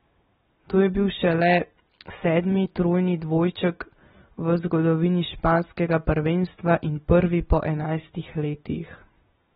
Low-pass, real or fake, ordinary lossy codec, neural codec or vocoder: 9.9 kHz; real; AAC, 16 kbps; none